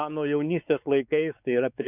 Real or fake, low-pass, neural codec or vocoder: fake; 3.6 kHz; codec, 16 kHz, 4 kbps, X-Codec, WavLM features, trained on Multilingual LibriSpeech